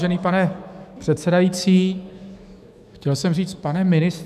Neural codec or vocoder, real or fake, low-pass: autoencoder, 48 kHz, 128 numbers a frame, DAC-VAE, trained on Japanese speech; fake; 14.4 kHz